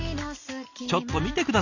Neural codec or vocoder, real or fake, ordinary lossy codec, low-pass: none; real; none; 7.2 kHz